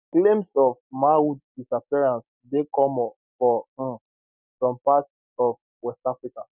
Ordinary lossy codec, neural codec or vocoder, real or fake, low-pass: none; none; real; 3.6 kHz